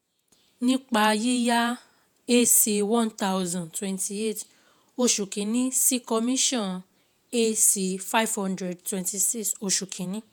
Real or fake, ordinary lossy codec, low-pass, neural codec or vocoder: fake; none; none; vocoder, 48 kHz, 128 mel bands, Vocos